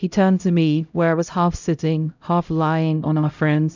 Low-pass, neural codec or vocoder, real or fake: 7.2 kHz; codec, 16 kHz, 0.5 kbps, X-Codec, HuBERT features, trained on LibriSpeech; fake